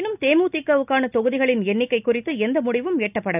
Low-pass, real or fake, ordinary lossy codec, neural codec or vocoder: 3.6 kHz; real; none; none